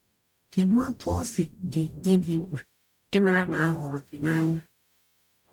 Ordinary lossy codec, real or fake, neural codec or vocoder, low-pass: none; fake; codec, 44.1 kHz, 0.9 kbps, DAC; 19.8 kHz